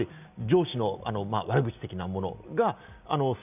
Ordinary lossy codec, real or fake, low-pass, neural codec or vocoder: none; real; 3.6 kHz; none